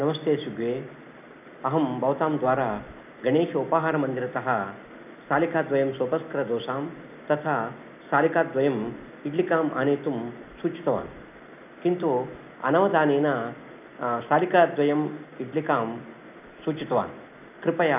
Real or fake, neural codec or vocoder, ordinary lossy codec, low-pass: real; none; none; 3.6 kHz